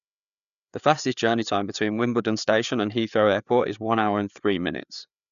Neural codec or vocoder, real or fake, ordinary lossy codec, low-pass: codec, 16 kHz, 4 kbps, FreqCodec, larger model; fake; none; 7.2 kHz